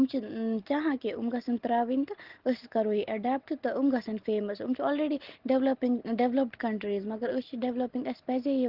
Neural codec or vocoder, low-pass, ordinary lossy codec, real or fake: none; 5.4 kHz; Opus, 16 kbps; real